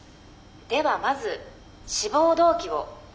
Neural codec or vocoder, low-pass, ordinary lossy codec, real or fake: none; none; none; real